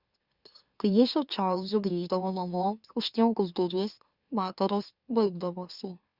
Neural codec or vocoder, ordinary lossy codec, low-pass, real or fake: autoencoder, 44.1 kHz, a latent of 192 numbers a frame, MeloTTS; Opus, 64 kbps; 5.4 kHz; fake